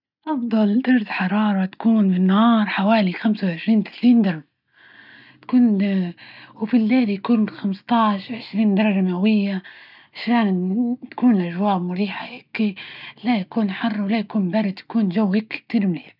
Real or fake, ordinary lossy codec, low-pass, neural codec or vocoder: real; none; 5.4 kHz; none